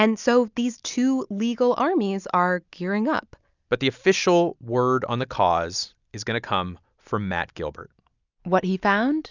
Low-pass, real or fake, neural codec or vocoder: 7.2 kHz; real; none